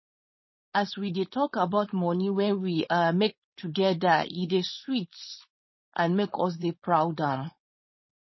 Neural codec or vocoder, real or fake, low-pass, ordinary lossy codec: codec, 16 kHz, 4.8 kbps, FACodec; fake; 7.2 kHz; MP3, 24 kbps